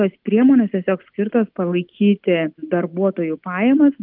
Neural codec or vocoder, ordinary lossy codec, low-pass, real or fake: none; Opus, 24 kbps; 5.4 kHz; real